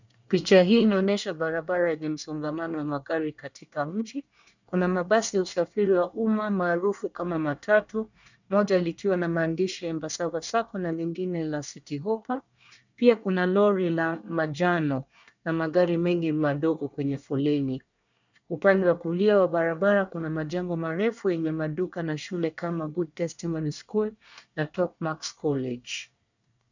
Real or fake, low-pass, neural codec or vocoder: fake; 7.2 kHz; codec, 24 kHz, 1 kbps, SNAC